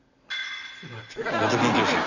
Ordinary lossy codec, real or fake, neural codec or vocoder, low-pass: none; real; none; 7.2 kHz